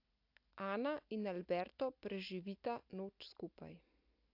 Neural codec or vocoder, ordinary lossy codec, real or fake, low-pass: none; AAC, 48 kbps; real; 5.4 kHz